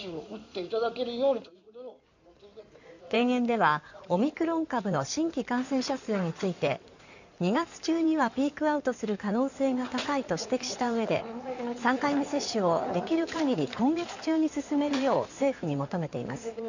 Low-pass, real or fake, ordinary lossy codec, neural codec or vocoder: 7.2 kHz; fake; none; codec, 16 kHz in and 24 kHz out, 2.2 kbps, FireRedTTS-2 codec